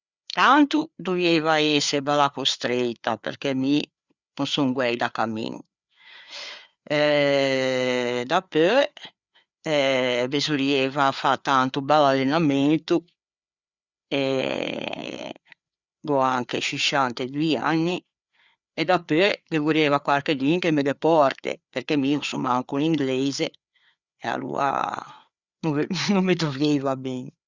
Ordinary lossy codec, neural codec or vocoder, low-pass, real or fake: Opus, 64 kbps; codec, 16 kHz, 4 kbps, FreqCodec, larger model; 7.2 kHz; fake